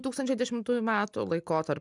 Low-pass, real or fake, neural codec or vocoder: 10.8 kHz; real; none